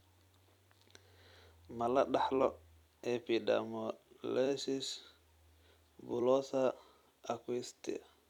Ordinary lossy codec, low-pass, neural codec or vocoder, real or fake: none; 19.8 kHz; vocoder, 44.1 kHz, 128 mel bands every 256 samples, BigVGAN v2; fake